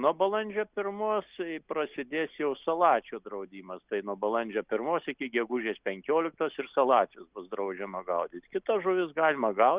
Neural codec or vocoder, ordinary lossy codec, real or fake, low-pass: none; Opus, 64 kbps; real; 3.6 kHz